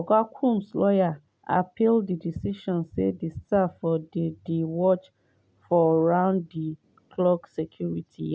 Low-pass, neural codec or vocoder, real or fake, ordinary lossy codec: none; none; real; none